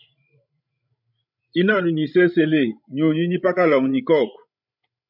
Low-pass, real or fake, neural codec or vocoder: 5.4 kHz; fake; codec, 16 kHz, 16 kbps, FreqCodec, larger model